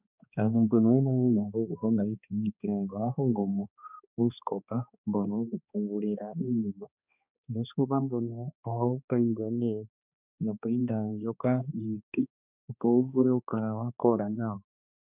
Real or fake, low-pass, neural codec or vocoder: fake; 3.6 kHz; codec, 16 kHz, 2 kbps, X-Codec, HuBERT features, trained on balanced general audio